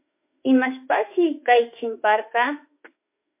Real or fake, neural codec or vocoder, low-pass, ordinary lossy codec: fake; autoencoder, 48 kHz, 32 numbers a frame, DAC-VAE, trained on Japanese speech; 3.6 kHz; MP3, 24 kbps